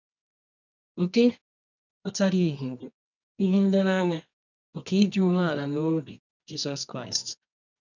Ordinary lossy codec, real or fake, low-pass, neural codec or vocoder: none; fake; 7.2 kHz; codec, 24 kHz, 0.9 kbps, WavTokenizer, medium music audio release